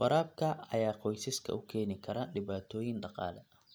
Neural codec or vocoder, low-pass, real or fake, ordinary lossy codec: none; none; real; none